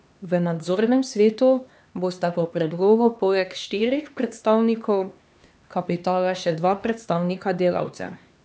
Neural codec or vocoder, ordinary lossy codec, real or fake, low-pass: codec, 16 kHz, 2 kbps, X-Codec, HuBERT features, trained on LibriSpeech; none; fake; none